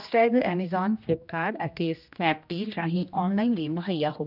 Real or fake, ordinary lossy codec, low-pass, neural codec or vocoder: fake; none; 5.4 kHz; codec, 16 kHz, 1 kbps, X-Codec, HuBERT features, trained on general audio